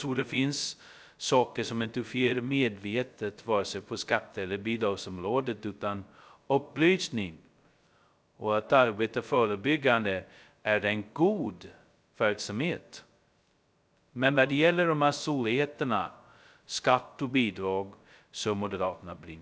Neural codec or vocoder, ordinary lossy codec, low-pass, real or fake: codec, 16 kHz, 0.2 kbps, FocalCodec; none; none; fake